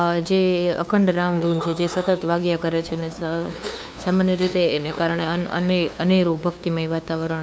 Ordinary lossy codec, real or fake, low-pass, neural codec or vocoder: none; fake; none; codec, 16 kHz, 2 kbps, FunCodec, trained on LibriTTS, 25 frames a second